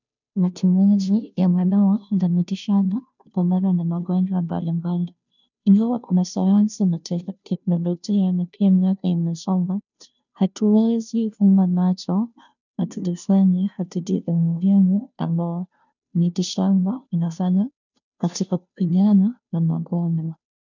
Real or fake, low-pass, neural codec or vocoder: fake; 7.2 kHz; codec, 16 kHz, 0.5 kbps, FunCodec, trained on Chinese and English, 25 frames a second